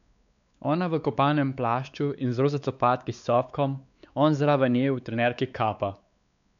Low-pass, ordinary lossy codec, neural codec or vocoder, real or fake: 7.2 kHz; none; codec, 16 kHz, 4 kbps, X-Codec, WavLM features, trained on Multilingual LibriSpeech; fake